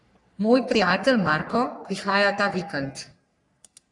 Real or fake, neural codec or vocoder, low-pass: fake; codec, 44.1 kHz, 3.4 kbps, Pupu-Codec; 10.8 kHz